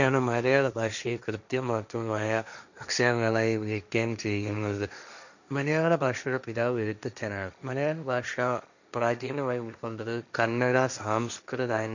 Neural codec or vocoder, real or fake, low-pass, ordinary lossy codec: codec, 16 kHz, 1.1 kbps, Voila-Tokenizer; fake; 7.2 kHz; none